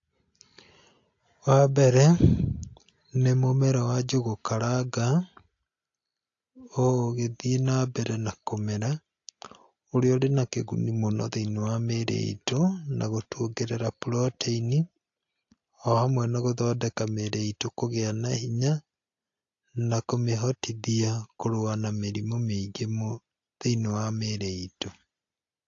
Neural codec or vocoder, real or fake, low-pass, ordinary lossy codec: none; real; 7.2 kHz; AAC, 48 kbps